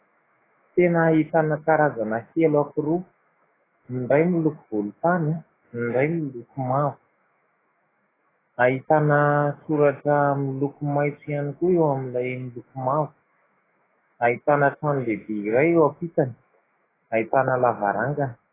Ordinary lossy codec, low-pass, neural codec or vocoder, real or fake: AAC, 16 kbps; 3.6 kHz; autoencoder, 48 kHz, 128 numbers a frame, DAC-VAE, trained on Japanese speech; fake